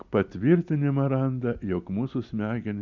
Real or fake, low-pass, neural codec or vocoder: real; 7.2 kHz; none